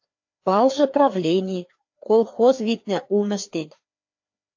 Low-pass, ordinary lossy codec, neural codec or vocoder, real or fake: 7.2 kHz; AAC, 48 kbps; codec, 16 kHz, 2 kbps, FreqCodec, larger model; fake